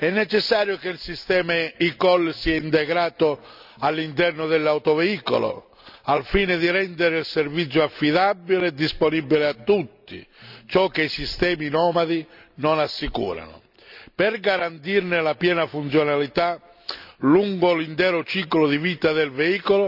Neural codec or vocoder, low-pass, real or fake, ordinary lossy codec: none; 5.4 kHz; real; none